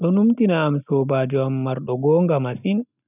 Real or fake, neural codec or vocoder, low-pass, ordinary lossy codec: real; none; 3.6 kHz; none